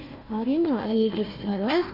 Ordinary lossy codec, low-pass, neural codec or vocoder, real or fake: none; 5.4 kHz; codec, 16 kHz, 1 kbps, FunCodec, trained on Chinese and English, 50 frames a second; fake